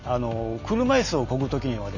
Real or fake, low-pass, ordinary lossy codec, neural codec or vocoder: real; 7.2 kHz; none; none